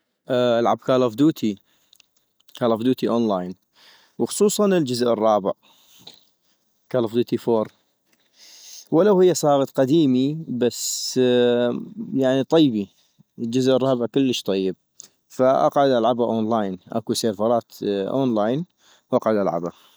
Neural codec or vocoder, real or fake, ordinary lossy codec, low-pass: none; real; none; none